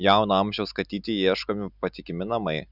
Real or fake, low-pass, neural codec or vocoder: real; 5.4 kHz; none